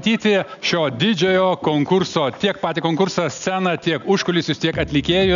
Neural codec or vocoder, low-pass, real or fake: none; 7.2 kHz; real